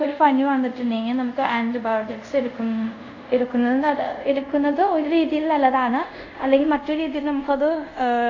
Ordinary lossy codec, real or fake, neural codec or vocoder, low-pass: AAC, 48 kbps; fake; codec, 24 kHz, 0.5 kbps, DualCodec; 7.2 kHz